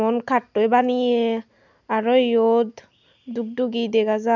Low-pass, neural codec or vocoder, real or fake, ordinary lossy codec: 7.2 kHz; none; real; none